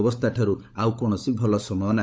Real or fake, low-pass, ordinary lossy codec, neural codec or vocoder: fake; none; none; codec, 16 kHz, 16 kbps, FunCodec, trained on LibriTTS, 50 frames a second